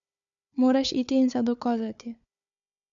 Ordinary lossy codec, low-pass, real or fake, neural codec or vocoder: none; 7.2 kHz; fake; codec, 16 kHz, 4 kbps, FunCodec, trained on Chinese and English, 50 frames a second